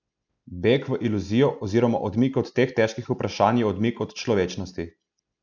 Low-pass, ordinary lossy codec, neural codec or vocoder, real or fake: 7.2 kHz; none; none; real